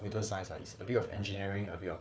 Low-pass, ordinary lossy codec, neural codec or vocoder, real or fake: none; none; codec, 16 kHz, 4 kbps, FunCodec, trained on Chinese and English, 50 frames a second; fake